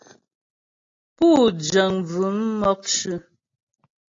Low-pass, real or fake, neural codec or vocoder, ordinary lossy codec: 7.2 kHz; real; none; AAC, 32 kbps